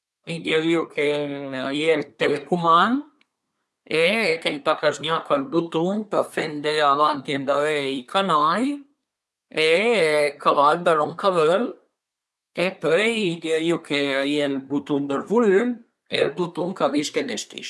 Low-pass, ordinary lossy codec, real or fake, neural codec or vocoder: none; none; fake; codec, 24 kHz, 1 kbps, SNAC